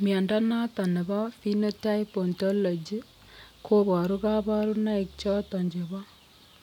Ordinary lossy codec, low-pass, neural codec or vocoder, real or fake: none; 19.8 kHz; none; real